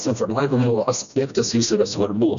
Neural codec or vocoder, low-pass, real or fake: codec, 16 kHz, 1 kbps, FreqCodec, smaller model; 7.2 kHz; fake